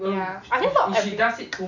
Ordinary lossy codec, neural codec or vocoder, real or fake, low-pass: none; none; real; 7.2 kHz